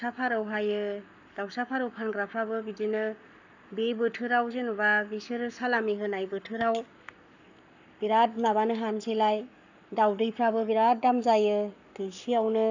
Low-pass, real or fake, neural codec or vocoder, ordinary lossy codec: 7.2 kHz; fake; codec, 44.1 kHz, 7.8 kbps, Pupu-Codec; none